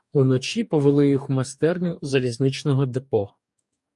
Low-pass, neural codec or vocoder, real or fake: 10.8 kHz; codec, 44.1 kHz, 2.6 kbps, DAC; fake